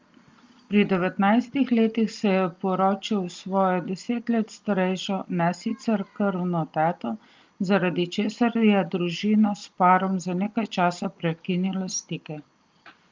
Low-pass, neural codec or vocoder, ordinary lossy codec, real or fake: 7.2 kHz; none; Opus, 32 kbps; real